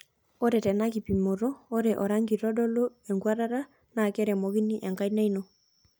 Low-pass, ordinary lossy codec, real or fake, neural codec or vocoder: none; none; real; none